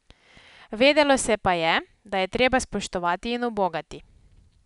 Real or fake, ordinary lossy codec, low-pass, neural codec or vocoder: real; none; 10.8 kHz; none